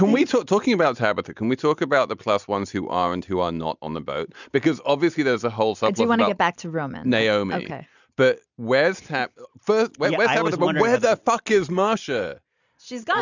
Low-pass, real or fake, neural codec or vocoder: 7.2 kHz; real; none